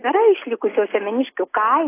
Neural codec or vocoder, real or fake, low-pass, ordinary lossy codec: none; real; 3.6 kHz; AAC, 24 kbps